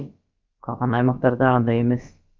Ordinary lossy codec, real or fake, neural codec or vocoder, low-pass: Opus, 24 kbps; fake; codec, 16 kHz, about 1 kbps, DyCAST, with the encoder's durations; 7.2 kHz